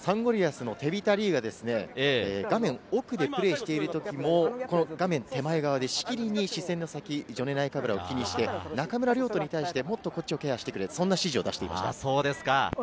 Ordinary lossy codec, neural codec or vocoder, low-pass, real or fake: none; none; none; real